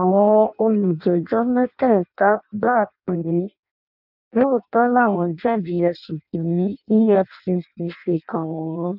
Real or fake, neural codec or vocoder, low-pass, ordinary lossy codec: fake; codec, 16 kHz in and 24 kHz out, 0.6 kbps, FireRedTTS-2 codec; 5.4 kHz; none